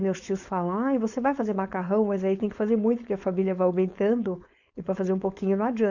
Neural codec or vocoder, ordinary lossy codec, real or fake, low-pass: codec, 16 kHz, 4.8 kbps, FACodec; none; fake; 7.2 kHz